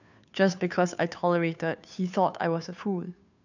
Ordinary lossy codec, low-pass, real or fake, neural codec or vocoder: none; 7.2 kHz; fake; codec, 16 kHz, 2 kbps, FunCodec, trained on Chinese and English, 25 frames a second